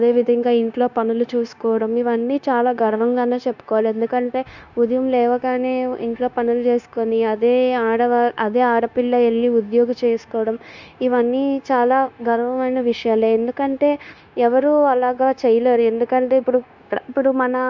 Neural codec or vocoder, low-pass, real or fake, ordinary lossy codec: codec, 16 kHz, 0.9 kbps, LongCat-Audio-Codec; 7.2 kHz; fake; none